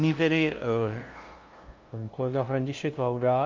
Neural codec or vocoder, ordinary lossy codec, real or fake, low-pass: codec, 16 kHz, 0.5 kbps, FunCodec, trained on LibriTTS, 25 frames a second; Opus, 32 kbps; fake; 7.2 kHz